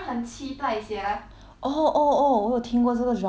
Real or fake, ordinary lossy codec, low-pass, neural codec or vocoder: real; none; none; none